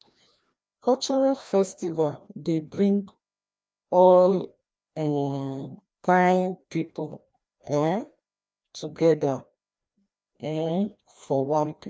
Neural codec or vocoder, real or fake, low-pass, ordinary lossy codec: codec, 16 kHz, 1 kbps, FreqCodec, larger model; fake; none; none